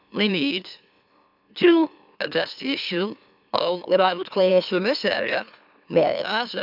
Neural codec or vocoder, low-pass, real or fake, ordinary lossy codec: autoencoder, 44.1 kHz, a latent of 192 numbers a frame, MeloTTS; 5.4 kHz; fake; none